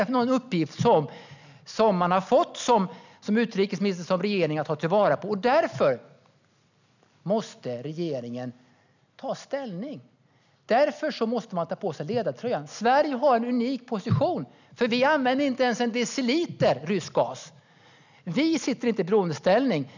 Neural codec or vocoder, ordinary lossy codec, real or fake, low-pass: none; none; real; 7.2 kHz